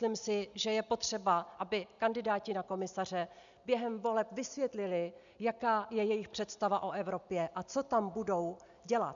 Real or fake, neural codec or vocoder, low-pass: real; none; 7.2 kHz